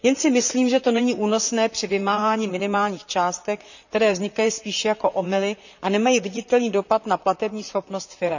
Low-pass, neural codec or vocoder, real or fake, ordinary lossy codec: 7.2 kHz; vocoder, 44.1 kHz, 128 mel bands, Pupu-Vocoder; fake; none